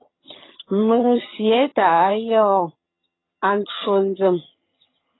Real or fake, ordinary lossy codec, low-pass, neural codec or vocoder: fake; AAC, 16 kbps; 7.2 kHz; vocoder, 22.05 kHz, 80 mel bands, HiFi-GAN